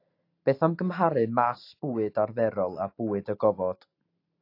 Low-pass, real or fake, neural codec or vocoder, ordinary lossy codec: 5.4 kHz; real; none; AAC, 32 kbps